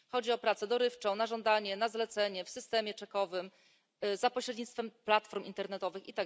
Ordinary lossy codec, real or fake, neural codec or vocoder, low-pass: none; real; none; none